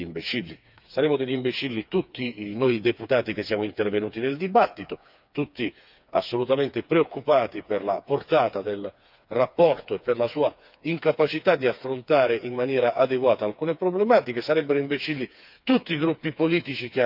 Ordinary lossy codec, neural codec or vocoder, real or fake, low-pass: none; codec, 16 kHz, 4 kbps, FreqCodec, smaller model; fake; 5.4 kHz